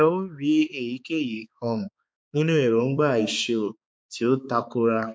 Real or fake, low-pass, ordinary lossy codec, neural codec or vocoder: fake; none; none; codec, 16 kHz, 4 kbps, X-Codec, HuBERT features, trained on balanced general audio